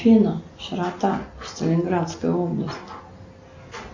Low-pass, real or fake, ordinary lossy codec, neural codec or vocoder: 7.2 kHz; real; MP3, 48 kbps; none